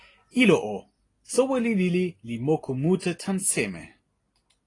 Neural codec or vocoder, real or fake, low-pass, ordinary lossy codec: none; real; 10.8 kHz; AAC, 32 kbps